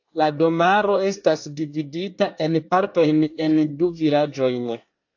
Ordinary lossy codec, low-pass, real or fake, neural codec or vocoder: AAC, 48 kbps; 7.2 kHz; fake; codec, 24 kHz, 1 kbps, SNAC